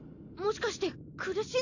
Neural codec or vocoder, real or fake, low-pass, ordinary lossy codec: none; real; 7.2 kHz; none